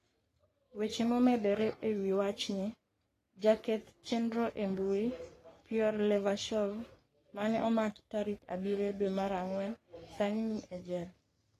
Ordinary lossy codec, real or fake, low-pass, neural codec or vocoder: AAC, 48 kbps; fake; 14.4 kHz; codec, 44.1 kHz, 3.4 kbps, Pupu-Codec